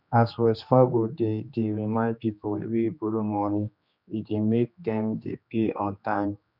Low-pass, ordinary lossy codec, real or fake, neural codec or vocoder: 5.4 kHz; none; fake; codec, 16 kHz, 2 kbps, X-Codec, HuBERT features, trained on general audio